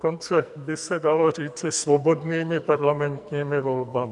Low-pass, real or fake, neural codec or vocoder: 10.8 kHz; fake; codec, 44.1 kHz, 2.6 kbps, SNAC